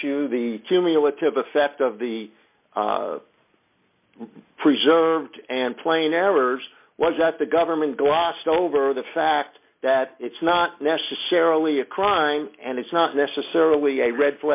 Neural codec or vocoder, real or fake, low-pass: none; real; 3.6 kHz